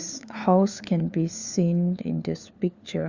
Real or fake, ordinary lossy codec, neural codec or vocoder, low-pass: fake; Opus, 64 kbps; codec, 16 kHz, 4 kbps, FunCodec, trained on LibriTTS, 50 frames a second; 7.2 kHz